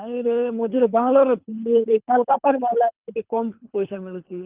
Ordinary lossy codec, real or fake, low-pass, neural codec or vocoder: Opus, 32 kbps; fake; 3.6 kHz; codec, 24 kHz, 3 kbps, HILCodec